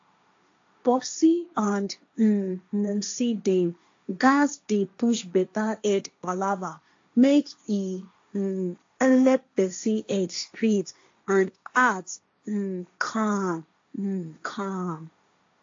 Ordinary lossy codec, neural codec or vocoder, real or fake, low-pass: AAC, 48 kbps; codec, 16 kHz, 1.1 kbps, Voila-Tokenizer; fake; 7.2 kHz